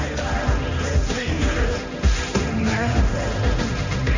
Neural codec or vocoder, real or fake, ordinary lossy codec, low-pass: codec, 16 kHz, 1.1 kbps, Voila-Tokenizer; fake; none; 7.2 kHz